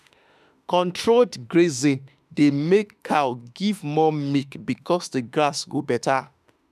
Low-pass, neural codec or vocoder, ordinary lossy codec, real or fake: 14.4 kHz; autoencoder, 48 kHz, 32 numbers a frame, DAC-VAE, trained on Japanese speech; none; fake